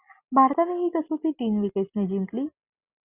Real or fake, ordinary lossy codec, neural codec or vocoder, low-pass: real; Opus, 64 kbps; none; 3.6 kHz